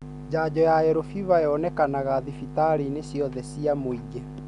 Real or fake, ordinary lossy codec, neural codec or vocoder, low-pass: real; none; none; 10.8 kHz